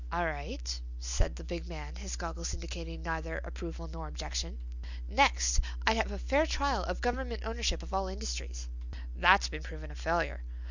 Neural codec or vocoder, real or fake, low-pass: none; real; 7.2 kHz